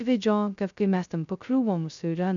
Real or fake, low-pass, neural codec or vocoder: fake; 7.2 kHz; codec, 16 kHz, 0.2 kbps, FocalCodec